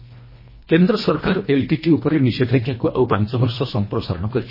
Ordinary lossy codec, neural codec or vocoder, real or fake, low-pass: MP3, 24 kbps; codec, 24 kHz, 1.5 kbps, HILCodec; fake; 5.4 kHz